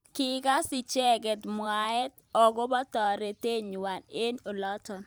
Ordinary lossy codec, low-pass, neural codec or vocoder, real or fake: none; none; vocoder, 44.1 kHz, 128 mel bands every 512 samples, BigVGAN v2; fake